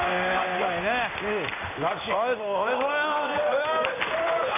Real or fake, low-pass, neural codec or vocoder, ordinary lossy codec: fake; 3.6 kHz; codec, 16 kHz in and 24 kHz out, 1 kbps, XY-Tokenizer; none